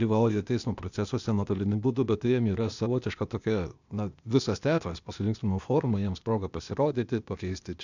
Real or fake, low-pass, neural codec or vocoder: fake; 7.2 kHz; codec, 16 kHz, 0.8 kbps, ZipCodec